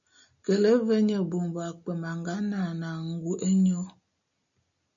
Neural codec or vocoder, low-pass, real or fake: none; 7.2 kHz; real